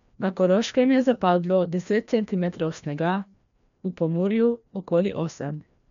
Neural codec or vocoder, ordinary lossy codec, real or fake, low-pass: codec, 16 kHz, 1 kbps, FreqCodec, larger model; none; fake; 7.2 kHz